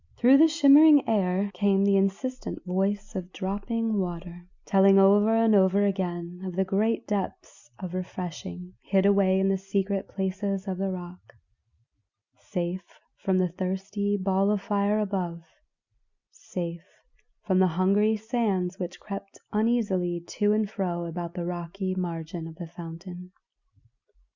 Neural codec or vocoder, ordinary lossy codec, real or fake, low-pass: none; Opus, 64 kbps; real; 7.2 kHz